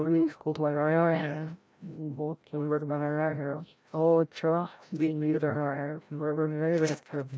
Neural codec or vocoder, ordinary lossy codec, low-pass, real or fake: codec, 16 kHz, 0.5 kbps, FreqCodec, larger model; none; none; fake